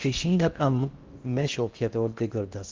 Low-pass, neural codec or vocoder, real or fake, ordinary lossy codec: 7.2 kHz; codec, 16 kHz in and 24 kHz out, 0.6 kbps, FocalCodec, streaming, 4096 codes; fake; Opus, 32 kbps